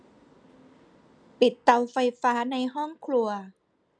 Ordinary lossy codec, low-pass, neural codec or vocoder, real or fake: none; 9.9 kHz; none; real